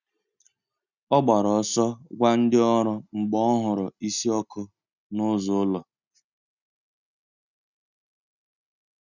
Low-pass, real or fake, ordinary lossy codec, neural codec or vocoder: 7.2 kHz; real; none; none